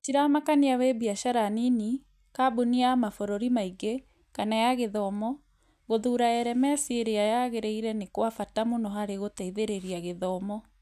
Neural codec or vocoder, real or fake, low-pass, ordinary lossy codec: none; real; 14.4 kHz; none